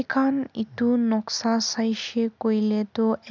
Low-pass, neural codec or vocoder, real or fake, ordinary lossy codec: none; none; real; none